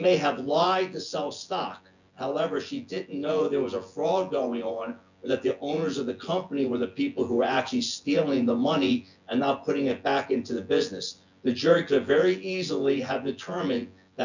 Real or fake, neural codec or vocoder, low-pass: fake; vocoder, 24 kHz, 100 mel bands, Vocos; 7.2 kHz